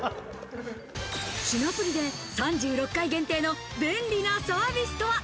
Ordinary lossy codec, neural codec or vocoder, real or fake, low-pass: none; none; real; none